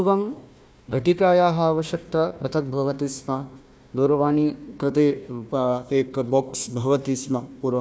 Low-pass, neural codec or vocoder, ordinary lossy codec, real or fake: none; codec, 16 kHz, 1 kbps, FunCodec, trained on Chinese and English, 50 frames a second; none; fake